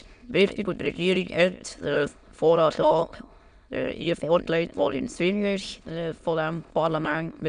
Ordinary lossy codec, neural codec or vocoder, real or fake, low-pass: none; autoencoder, 22.05 kHz, a latent of 192 numbers a frame, VITS, trained on many speakers; fake; 9.9 kHz